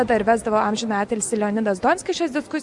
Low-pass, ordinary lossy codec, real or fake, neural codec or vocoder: 10.8 kHz; Opus, 64 kbps; real; none